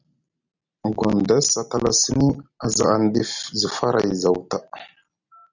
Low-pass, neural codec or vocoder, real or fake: 7.2 kHz; none; real